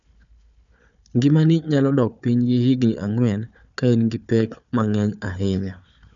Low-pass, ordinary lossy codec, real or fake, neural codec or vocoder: 7.2 kHz; none; fake; codec, 16 kHz, 4 kbps, FunCodec, trained on Chinese and English, 50 frames a second